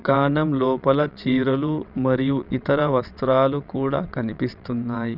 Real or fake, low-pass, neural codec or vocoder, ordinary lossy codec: fake; 5.4 kHz; vocoder, 22.05 kHz, 80 mel bands, WaveNeXt; none